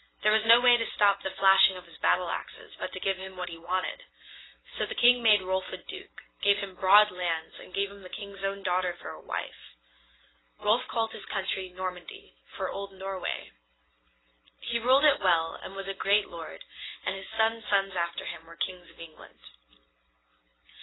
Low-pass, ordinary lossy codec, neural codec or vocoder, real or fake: 7.2 kHz; AAC, 16 kbps; none; real